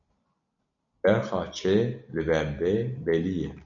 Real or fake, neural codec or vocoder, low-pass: real; none; 7.2 kHz